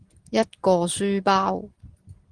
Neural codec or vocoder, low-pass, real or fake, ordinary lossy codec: none; 9.9 kHz; real; Opus, 16 kbps